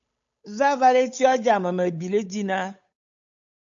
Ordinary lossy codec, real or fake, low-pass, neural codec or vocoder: AAC, 64 kbps; fake; 7.2 kHz; codec, 16 kHz, 8 kbps, FunCodec, trained on Chinese and English, 25 frames a second